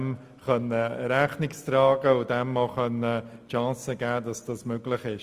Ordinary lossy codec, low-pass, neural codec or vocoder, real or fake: Opus, 32 kbps; 14.4 kHz; none; real